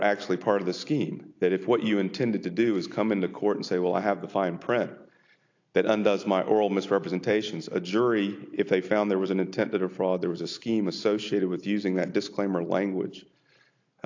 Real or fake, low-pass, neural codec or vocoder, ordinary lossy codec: real; 7.2 kHz; none; AAC, 48 kbps